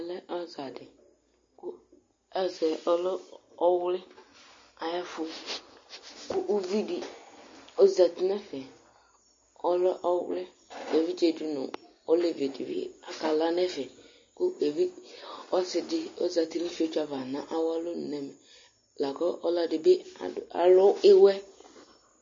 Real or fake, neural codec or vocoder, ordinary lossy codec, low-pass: real; none; MP3, 32 kbps; 7.2 kHz